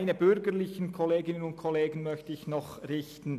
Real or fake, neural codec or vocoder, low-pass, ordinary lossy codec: real; none; 14.4 kHz; none